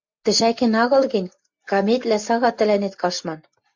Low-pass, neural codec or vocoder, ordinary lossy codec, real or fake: 7.2 kHz; none; MP3, 48 kbps; real